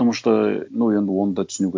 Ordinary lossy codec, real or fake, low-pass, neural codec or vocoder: none; real; none; none